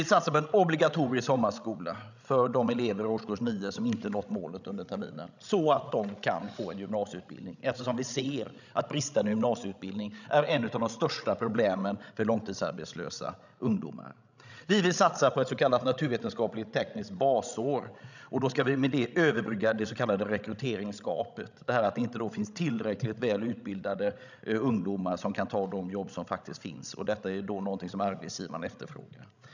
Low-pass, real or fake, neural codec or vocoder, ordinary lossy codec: 7.2 kHz; fake; codec, 16 kHz, 16 kbps, FreqCodec, larger model; none